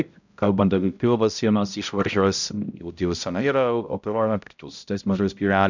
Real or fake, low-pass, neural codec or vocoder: fake; 7.2 kHz; codec, 16 kHz, 0.5 kbps, X-Codec, HuBERT features, trained on balanced general audio